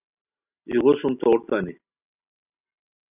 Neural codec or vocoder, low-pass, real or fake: none; 3.6 kHz; real